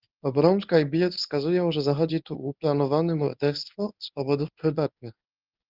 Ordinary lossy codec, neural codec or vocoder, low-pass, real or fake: Opus, 24 kbps; codec, 24 kHz, 0.9 kbps, WavTokenizer, medium speech release version 1; 5.4 kHz; fake